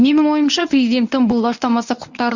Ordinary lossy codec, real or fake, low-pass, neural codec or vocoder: none; fake; 7.2 kHz; codec, 24 kHz, 0.9 kbps, WavTokenizer, medium speech release version 1